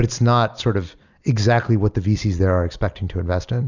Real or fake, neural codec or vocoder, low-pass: real; none; 7.2 kHz